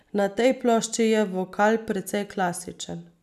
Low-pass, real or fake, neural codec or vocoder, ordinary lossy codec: 14.4 kHz; real; none; none